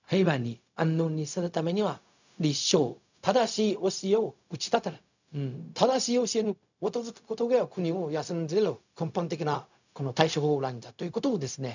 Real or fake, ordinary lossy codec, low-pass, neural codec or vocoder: fake; none; 7.2 kHz; codec, 16 kHz, 0.4 kbps, LongCat-Audio-Codec